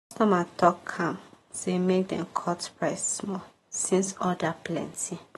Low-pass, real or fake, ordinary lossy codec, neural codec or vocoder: 19.8 kHz; real; AAC, 32 kbps; none